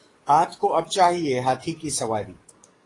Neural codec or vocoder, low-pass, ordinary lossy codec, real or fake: codec, 44.1 kHz, 7.8 kbps, DAC; 10.8 kHz; AAC, 32 kbps; fake